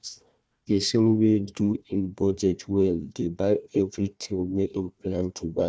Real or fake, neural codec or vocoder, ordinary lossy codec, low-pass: fake; codec, 16 kHz, 1 kbps, FunCodec, trained on Chinese and English, 50 frames a second; none; none